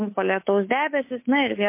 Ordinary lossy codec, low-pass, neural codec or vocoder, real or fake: MP3, 24 kbps; 3.6 kHz; codec, 24 kHz, 3.1 kbps, DualCodec; fake